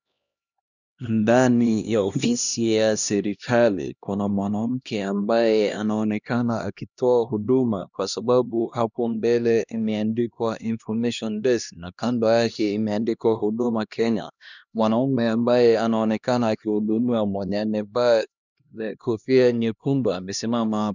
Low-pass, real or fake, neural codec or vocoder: 7.2 kHz; fake; codec, 16 kHz, 1 kbps, X-Codec, HuBERT features, trained on LibriSpeech